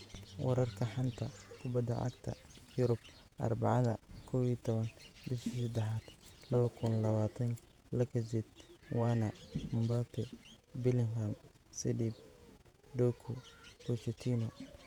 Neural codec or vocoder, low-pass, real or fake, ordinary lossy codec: vocoder, 48 kHz, 128 mel bands, Vocos; 19.8 kHz; fake; none